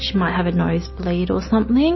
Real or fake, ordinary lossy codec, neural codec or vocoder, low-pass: real; MP3, 24 kbps; none; 7.2 kHz